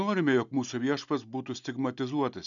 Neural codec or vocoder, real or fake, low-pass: none; real; 7.2 kHz